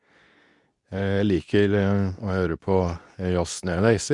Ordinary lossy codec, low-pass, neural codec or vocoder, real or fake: none; 10.8 kHz; codec, 24 kHz, 0.9 kbps, WavTokenizer, medium speech release version 2; fake